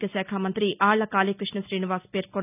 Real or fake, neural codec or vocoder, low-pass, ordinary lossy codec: real; none; 3.6 kHz; none